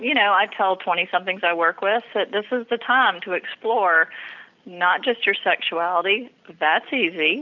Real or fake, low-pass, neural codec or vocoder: real; 7.2 kHz; none